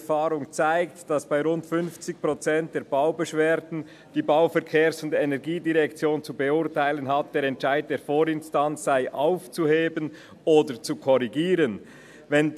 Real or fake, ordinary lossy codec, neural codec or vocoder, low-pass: real; AAC, 96 kbps; none; 14.4 kHz